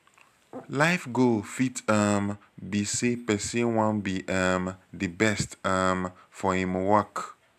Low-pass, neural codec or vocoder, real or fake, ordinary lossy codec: 14.4 kHz; none; real; none